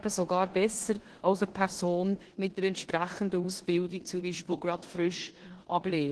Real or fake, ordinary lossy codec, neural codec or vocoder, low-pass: fake; Opus, 16 kbps; codec, 16 kHz in and 24 kHz out, 0.9 kbps, LongCat-Audio-Codec, four codebook decoder; 10.8 kHz